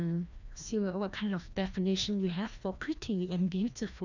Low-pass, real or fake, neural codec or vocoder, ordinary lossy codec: 7.2 kHz; fake; codec, 16 kHz, 1 kbps, FreqCodec, larger model; none